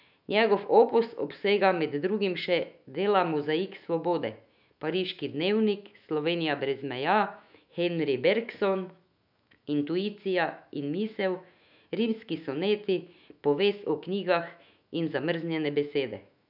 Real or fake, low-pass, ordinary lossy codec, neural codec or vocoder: fake; 5.4 kHz; none; autoencoder, 48 kHz, 128 numbers a frame, DAC-VAE, trained on Japanese speech